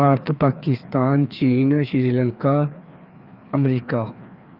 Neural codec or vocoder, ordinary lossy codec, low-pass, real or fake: codec, 16 kHz, 2 kbps, FreqCodec, larger model; Opus, 24 kbps; 5.4 kHz; fake